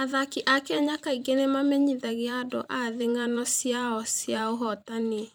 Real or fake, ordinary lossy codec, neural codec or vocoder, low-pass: fake; none; vocoder, 44.1 kHz, 128 mel bands every 512 samples, BigVGAN v2; none